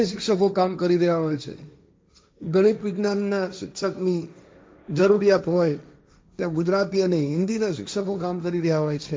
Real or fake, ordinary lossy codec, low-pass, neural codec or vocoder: fake; none; none; codec, 16 kHz, 1.1 kbps, Voila-Tokenizer